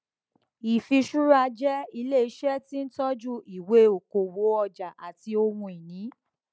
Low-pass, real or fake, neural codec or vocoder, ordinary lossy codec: none; real; none; none